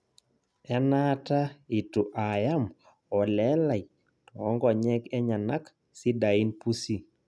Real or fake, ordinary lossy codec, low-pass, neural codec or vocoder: real; none; none; none